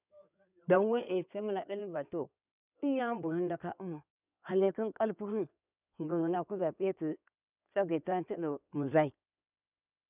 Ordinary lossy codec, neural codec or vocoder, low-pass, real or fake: none; codec, 16 kHz in and 24 kHz out, 2.2 kbps, FireRedTTS-2 codec; 3.6 kHz; fake